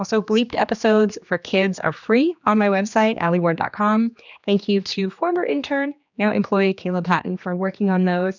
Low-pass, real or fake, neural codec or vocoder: 7.2 kHz; fake; codec, 16 kHz, 2 kbps, X-Codec, HuBERT features, trained on general audio